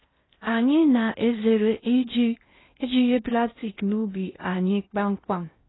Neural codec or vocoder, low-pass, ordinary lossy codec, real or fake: codec, 16 kHz in and 24 kHz out, 0.6 kbps, FocalCodec, streaming, 4096 codes; 7.2 kHz; AAC, 16 kbps; fake